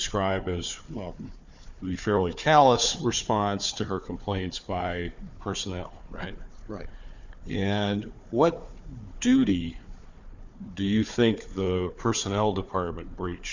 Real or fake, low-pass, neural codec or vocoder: fake; 7.2 kHz; codec, 16 kHz, 4 kbps, FunCodec, trained on Chinese and English, 50 frames a second